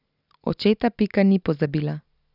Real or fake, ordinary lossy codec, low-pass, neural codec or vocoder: real; none; 5.4 kHz; none